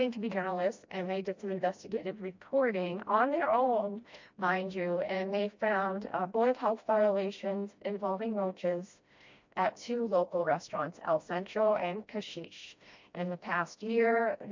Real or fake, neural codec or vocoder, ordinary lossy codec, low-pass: fake; codec, 16 kHz, 1 kbps, FreqCodec, smaller model; MP3, 48 kbps; 7.2 kHz